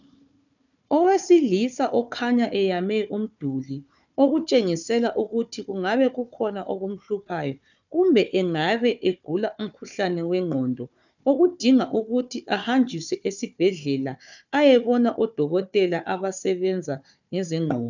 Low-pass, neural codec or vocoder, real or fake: 7.2 kHz; codec, 16 kHz, 4 kbps, FunCodec, trained on Chinese and English, 50 frames a second; fake